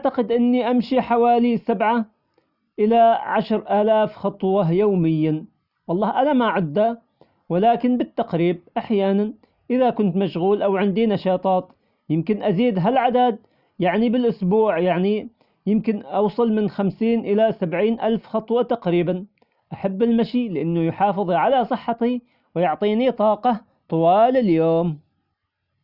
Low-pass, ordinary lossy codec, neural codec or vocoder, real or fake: 5.4 kHz; none; none; real